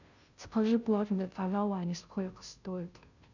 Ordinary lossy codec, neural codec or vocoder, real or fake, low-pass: none; codec, 16 kHz, 0.5 kbps, FunCodec, trained on Chinese and English, 25 frames a second; fake; 7.2 kHz